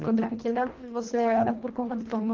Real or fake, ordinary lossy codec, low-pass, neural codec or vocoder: fake; Opus, 16 kbps; 7.2 kHz; codec, 24 kHz, 1.5 kbps, HILCodec